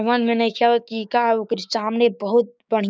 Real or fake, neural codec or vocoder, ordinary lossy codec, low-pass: fake; codec, 16 kHz, 6 kbps, DAC; none; none